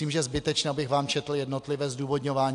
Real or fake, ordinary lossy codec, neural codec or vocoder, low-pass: real; AAC, 64 kbps; none; 10.8 kHz